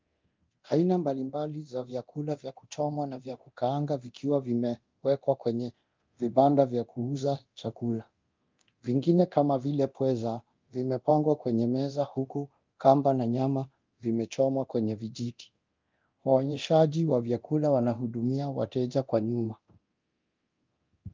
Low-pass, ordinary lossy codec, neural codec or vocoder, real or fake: 7.2 kHz; Opus, 24 kbps; codec, 24 kHz, 0.9 kbps, DualCodec; fake